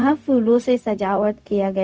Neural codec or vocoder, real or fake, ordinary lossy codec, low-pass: codec, 16 kHz, 0.4 kbps, LongCat-Audio-Codec; fake; none; none